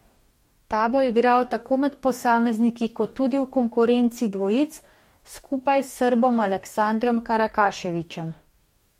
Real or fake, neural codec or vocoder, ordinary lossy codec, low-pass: fake; codec, 44.1 kHz, 2.6 kbps, DAC; MP3, 64 kbps; 19.8 kHz